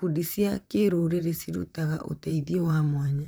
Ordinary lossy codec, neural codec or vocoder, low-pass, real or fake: none; vocoder, 44.1 kHz, 128 mel bands, Pupu-Vocoder; none; fake